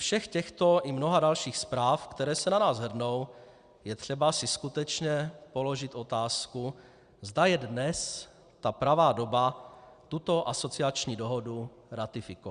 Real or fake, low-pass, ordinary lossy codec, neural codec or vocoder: real; 9.9 kHz; Opus, 64 kbps; none